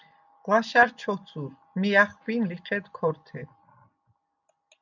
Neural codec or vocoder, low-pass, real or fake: none; 7.2 kHz; real